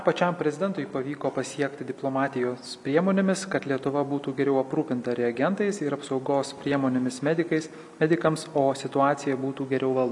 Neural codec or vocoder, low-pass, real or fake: none; 10.8 kHz; real